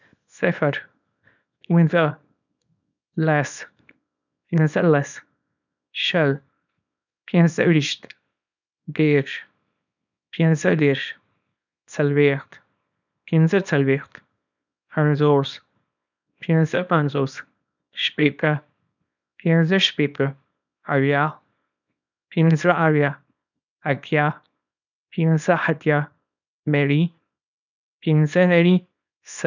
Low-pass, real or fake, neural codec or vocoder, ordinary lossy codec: 7.2 kHz; fake; codec, 24 kHz, 0.9 kbps, WavTokenizer, small release; none